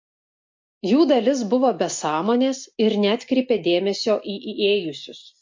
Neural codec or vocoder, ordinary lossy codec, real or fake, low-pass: none; MP3, 48 kbps; real; 7.2 kHz